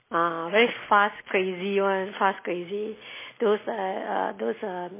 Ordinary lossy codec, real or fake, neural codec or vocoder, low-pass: MP3, 16 kbps; real; none; 3.6 kHz